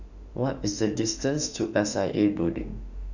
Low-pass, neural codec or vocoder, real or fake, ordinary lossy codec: 7.2 kHz; autoencoder, 48 kHz, 32 numbers a frame, DAC-VAE, trained on Japanese speech; fake; none